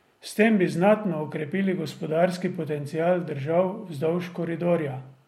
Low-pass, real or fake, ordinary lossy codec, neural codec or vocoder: 19.8 kHz; real; MP3, 64 kbps; none